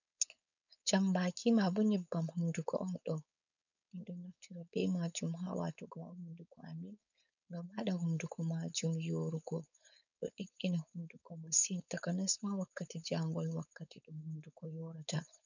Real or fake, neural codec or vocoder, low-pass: fake; codec, 16 kHz, 4.8 kbps, FACodec; 7.2 kHz